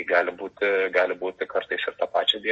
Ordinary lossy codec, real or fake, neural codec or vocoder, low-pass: MP3, 32 kbps; real; none; 10.8 kHz